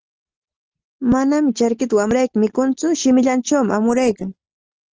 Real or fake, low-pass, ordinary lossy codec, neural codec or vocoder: real; 7.2 kHz; Opus, 16 kbps; none